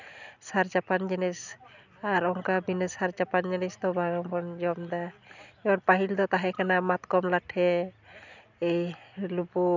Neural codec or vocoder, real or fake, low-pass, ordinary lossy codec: none; real; 7.2 kHz; none